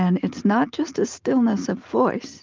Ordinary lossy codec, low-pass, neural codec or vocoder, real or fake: Opus, 24 kbps; 7.2 kHz; none; real